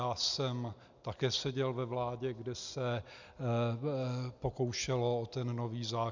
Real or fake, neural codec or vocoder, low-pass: real; none; 7.2 kHz